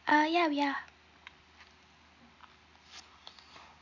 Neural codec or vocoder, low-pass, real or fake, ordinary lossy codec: none; 7.2 kHz; real; none